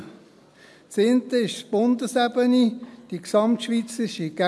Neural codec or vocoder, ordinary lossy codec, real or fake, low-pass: none; none; real; none